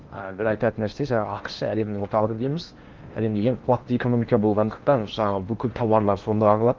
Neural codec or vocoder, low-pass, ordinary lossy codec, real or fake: codec, 16 kHz in and 24 kHz out, 0.8 kbps, FocalCodec, streaming, 65536 codes; 7.2 kHz; Opus, 32 kbps; fake